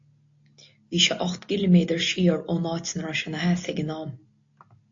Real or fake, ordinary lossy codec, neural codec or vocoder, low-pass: real; AAC, 48 kbps; none; 7.2 kHz